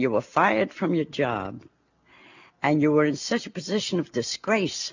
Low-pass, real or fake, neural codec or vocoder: 7.2 kHz; real; none